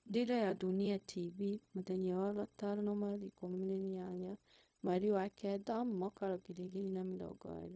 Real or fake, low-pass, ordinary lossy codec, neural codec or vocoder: fake; none; none; codec, 16 kHz, 0.4 kbps, LongCat-Audio-Codec